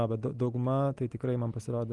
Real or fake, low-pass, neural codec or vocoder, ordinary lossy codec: real; 10.8 kHz; none; Opus, 24 kbps